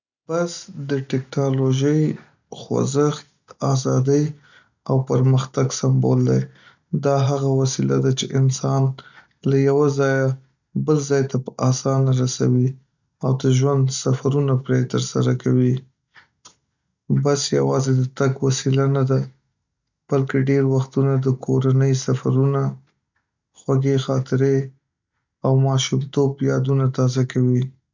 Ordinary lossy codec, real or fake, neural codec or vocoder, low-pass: none; real; none; 7.2 kHz